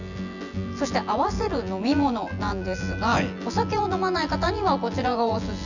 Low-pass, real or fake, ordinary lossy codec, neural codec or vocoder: 7.2 kHz; fake; none; vocoder, 24 kHz, 100 mel bands, Vocos